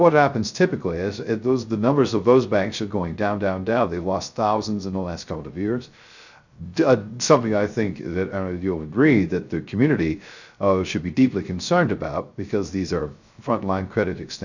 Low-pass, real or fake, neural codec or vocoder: 7.2 kHz; fake; codec, 16 kHz, 0.3 kbps, FocalCodec